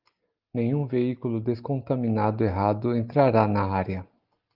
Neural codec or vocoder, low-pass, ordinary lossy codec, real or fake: none; 5.4 kHz; Opus, 32 kbps; real